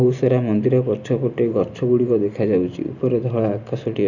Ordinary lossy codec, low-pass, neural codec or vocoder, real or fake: none; 7.2 kHz; none; real